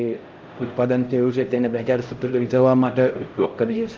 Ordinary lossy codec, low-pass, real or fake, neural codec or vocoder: Opus, 24 kbps; 7.2 kHz; fake; codec, 16 kHz, 0.5 kbps, X-Codec, HuBERT features, trained on LibriSpeech